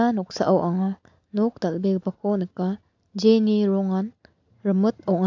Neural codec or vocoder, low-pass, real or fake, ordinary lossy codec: none; 7.2 kHz; real; AAC, 48 kbps